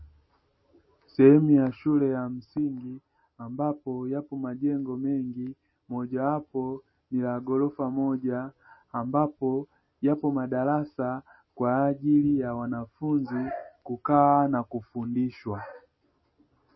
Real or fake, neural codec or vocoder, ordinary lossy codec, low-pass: real; none; MP3, 24 kbps; 7.2 kHz